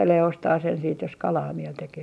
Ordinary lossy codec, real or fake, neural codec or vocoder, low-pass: none; real; none; none